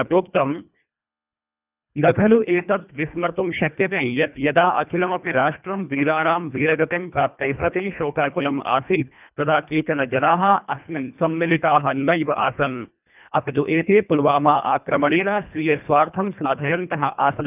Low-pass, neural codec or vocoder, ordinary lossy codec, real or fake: 3.6 kHz; codec, 24 kHz, 1.5 kbps, HILCodec; none; fake